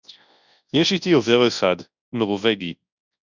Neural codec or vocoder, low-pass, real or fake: codec, 24 kHz, 0.9 kbps, WavTokenizer, large speech release; 7.2 kHz; fake